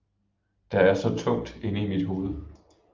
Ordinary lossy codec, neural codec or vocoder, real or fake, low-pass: Opus, 32 kbps; none; real; 7.2 kHz